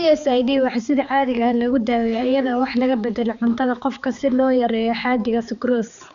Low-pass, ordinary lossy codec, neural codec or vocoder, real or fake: 7.2 kHz; MP3, 96 kbps; codec, 16 kHz, 4 kbps, X-Codec, HuBERT features, trained on balanced general audio; fake